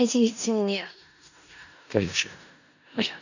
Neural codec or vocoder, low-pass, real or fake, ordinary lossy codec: codec, 16 kHz in and 24 kHz out, 0.4 kbps, LongCat-Audio-Codec, four codebook decoder; 7.2 kHz; fake; none